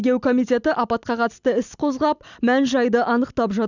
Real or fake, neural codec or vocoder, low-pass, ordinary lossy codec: real; none; 7.2 kHz; none